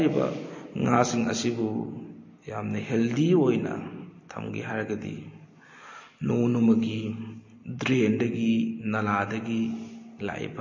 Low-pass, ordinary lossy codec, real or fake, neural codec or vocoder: 7.2 kHz; MP3, 32 kbps; real; none